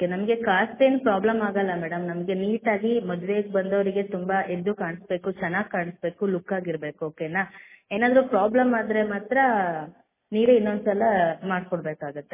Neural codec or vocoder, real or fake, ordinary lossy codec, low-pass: none; real; MP3, 16 kbps; 3.6 kHz